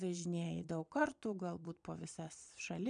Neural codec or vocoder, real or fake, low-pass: vocoder, 22.05 kHz, 80 mel bands, Vocos; fake; 9.9 kHz